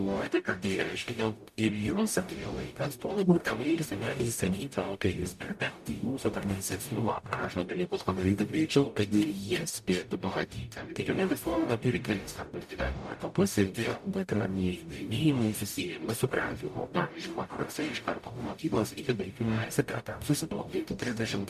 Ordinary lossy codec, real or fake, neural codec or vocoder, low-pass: MP3, 96 kbps; fake; codec, 44.1 kHz, 0.9 kbps, DAC; 14.4 kHz